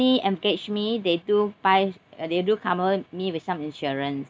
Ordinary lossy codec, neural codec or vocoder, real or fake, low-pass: none; none; real; none